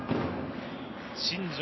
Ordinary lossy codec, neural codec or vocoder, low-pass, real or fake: MP3, 24 kbps; none; 7.2 kHz; real